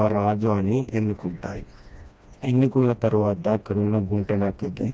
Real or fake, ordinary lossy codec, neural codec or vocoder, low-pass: fake; none; codec, 16 kHz, 1 kbps, FreqCodec, smaller model; none